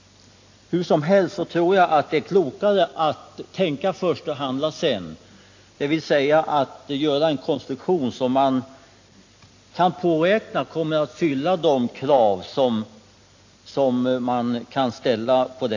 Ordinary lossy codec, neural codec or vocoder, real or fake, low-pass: AAC, 48 kbps; none; real; 7.2 kHz